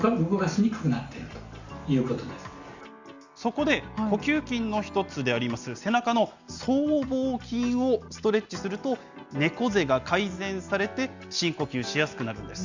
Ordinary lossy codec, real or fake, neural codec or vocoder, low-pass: Opus, 64 kbps; real; none; 7.2 kHz